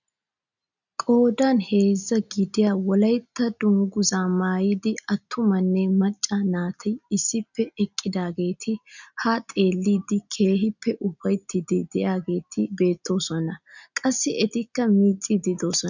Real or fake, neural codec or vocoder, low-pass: real; none; 7.2 kHz